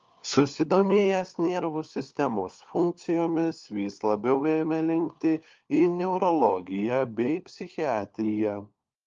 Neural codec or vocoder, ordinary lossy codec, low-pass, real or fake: codec, 16 kHz, 4 kbps, FunCodec, trained on LibriTTS, 50 frames a second; Opus, 32 kbps; 7.2 kHz; fake